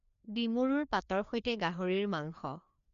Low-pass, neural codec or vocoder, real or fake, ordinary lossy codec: 7.2 kHz; codec, 16 kHz, 2 kbps, FreqCodec, larger model; fake; none